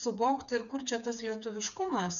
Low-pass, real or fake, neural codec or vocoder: 7.2 kHz; fake; codec, 16 kHz, 4 kbps, FreqCodec, smaller model